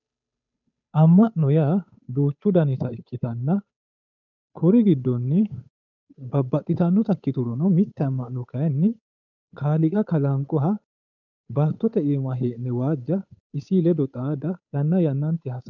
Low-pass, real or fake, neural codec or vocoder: 7.2 kHz; fake; codec, 16 kHz, 8 kbps, FunCodec, trained on Chinese and English, 25 frames a second